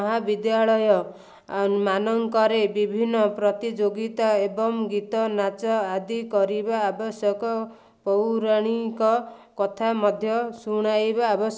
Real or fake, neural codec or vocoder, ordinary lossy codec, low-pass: real; none; none; none